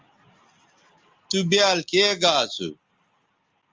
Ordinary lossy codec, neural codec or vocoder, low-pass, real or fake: Opus, 32 kbps; none; 7.2 kHz; real